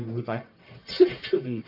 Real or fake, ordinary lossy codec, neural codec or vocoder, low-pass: fake; none; codec, 44.1 kHz, 1.7 kbps, Pupu-Codec; 5.4 kHz